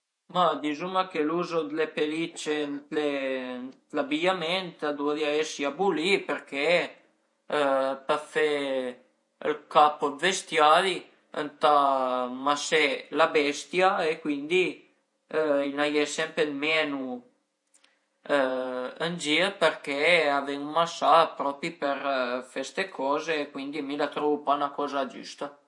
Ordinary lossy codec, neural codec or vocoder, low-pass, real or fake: MP3, 48 kbps; vocoder, 48 kHz, 128 mel bands, Vocos; 10.8 kHz; fake